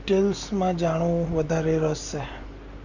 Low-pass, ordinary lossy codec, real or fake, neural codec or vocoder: 7.2 kHz; none; real; none